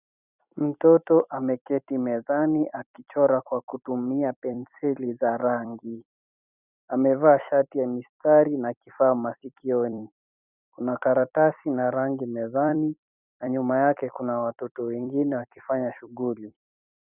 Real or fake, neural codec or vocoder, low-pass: fake; vocoder, 44.1 kHz, 128 mel bands every 256 samples, BigVGAN v2; 3.6 kHz